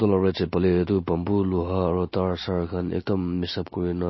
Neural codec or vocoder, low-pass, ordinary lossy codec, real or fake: none; 7.2 kHz; MP3, 24 kbps; real